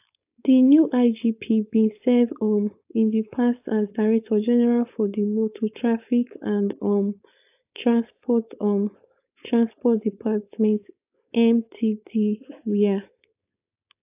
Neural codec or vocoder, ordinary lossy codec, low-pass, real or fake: codec, 16 kHz, 4.8 kbps, FACodec; AAC, 32 kbps; 3.6 kHz; fake